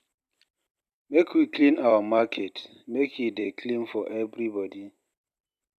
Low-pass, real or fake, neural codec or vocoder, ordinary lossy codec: 14.4 kHz; fake; vocoder, 44.1 kHz, 128 mel bands every 256 samples, BigVGAN v2; none